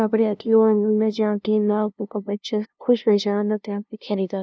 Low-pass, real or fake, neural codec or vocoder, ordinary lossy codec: none; fake; codec, 16 kHz, 0.5 kbps, FunCodec, trained on LibriTTS, 25 frames a second; none